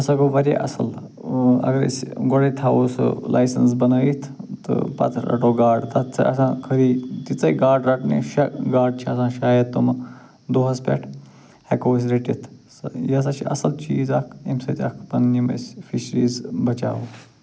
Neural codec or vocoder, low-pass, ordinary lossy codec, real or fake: none; none; none; real